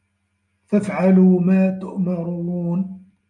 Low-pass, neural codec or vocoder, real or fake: 10.8 kHz; none; real